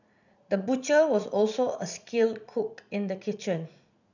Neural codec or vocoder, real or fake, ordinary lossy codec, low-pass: vocoder, 44.1 kHz, 80 mel bands, Vocos; fake; none; 7.2 kHz